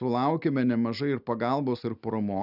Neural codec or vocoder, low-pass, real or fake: none; 5.4 kHz; real